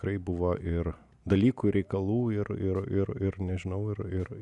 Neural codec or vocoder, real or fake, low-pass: none; real; 10.8 kHz